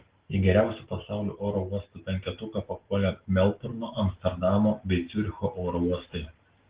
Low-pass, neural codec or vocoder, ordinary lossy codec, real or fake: 3.6 kHz; none; Opus, 16 kbps; real